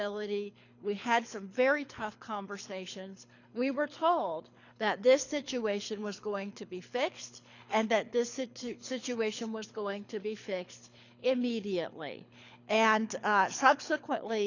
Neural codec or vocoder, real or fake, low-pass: codec, 24 kHz, 6 kbps, HILCodec; fake; 7.2 kHz